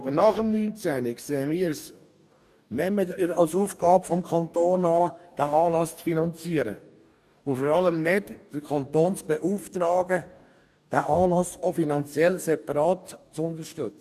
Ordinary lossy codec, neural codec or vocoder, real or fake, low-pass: none; codec, 44.1 kHz, 2.6 kbps, DAC; fake; 14.4 kHz